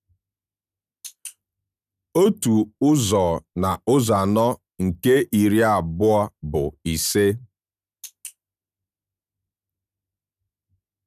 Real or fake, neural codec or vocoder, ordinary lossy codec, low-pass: real; none; none; 14.4 kHz